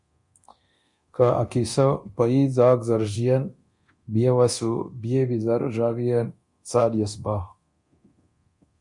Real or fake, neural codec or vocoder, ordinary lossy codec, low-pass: fake; codec, 24 kHz, 0.9 kbps, DualCodec; MP3, 48 kbps; 10.8 kHz